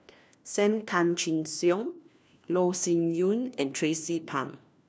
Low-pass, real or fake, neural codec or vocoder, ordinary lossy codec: none; fake; codec, 16 kHz, 1 kbps, FunCodec, trained on LibriTTS, 50 frames a second; none